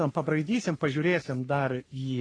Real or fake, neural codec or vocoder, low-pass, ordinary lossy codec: fake; codec, 44.1 kHz, 3.4 kbps, Pupu-Codec; 9.9 kHz; AAC, 32 kbps